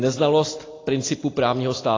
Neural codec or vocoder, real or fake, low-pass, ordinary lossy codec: none; real; 7.2 kHz; AAC, 32 kbps